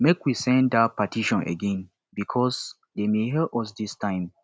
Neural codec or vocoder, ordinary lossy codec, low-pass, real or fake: none; none; none; real